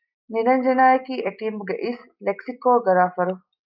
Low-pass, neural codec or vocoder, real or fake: 5.4 kHz; none; real